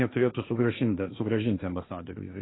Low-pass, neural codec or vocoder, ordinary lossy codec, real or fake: 7.2 kHz; codec, 16 kHz, 1.1 kbps, Voila-Tokenizer; AAC, 16 kbps; fake